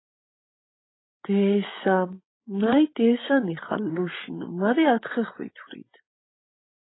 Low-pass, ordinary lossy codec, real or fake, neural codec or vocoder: 7.2 kHz; AAC, 16 kbps; fake; codec, 16 kHz, 16 kbps, FreqCodec, larger model